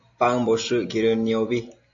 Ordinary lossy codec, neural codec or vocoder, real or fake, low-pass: AAC, 48 kbps; none; real; 7.2 kHz